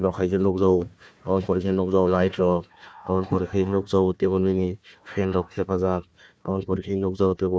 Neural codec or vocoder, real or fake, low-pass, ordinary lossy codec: codec, 16 kHz, 1 kbps, FunCodec, trained on Chinese and English, 50 frames a second; fake; none; none